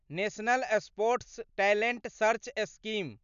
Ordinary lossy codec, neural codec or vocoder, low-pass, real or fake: none; none; 7.2 kHz; real